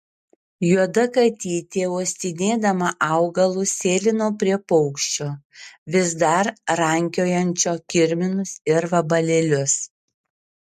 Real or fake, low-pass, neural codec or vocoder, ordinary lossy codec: real; 14.4 kHz; none; MP3, 64 kbps